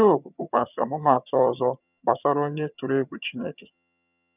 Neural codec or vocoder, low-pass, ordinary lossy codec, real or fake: vocoder, 22.05 kHz, 80 mel bands, HiFi-GAN; 3.6 kHz; none; fake